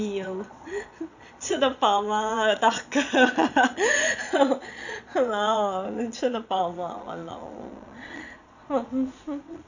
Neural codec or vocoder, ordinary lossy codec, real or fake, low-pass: vocoder, 22.05 kHz, 80 mel bands, Vocos; none; fake; 7.2 kHz